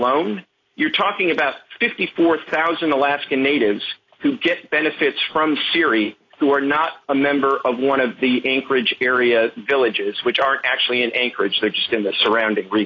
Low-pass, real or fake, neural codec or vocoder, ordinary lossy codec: 7.2 kHz; real; none; AAC, 32 kbps